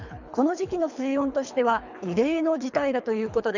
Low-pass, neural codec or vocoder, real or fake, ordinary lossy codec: 7.2 kHz; codec, 24 kHz, 3 kbps, HILCodec; fake; none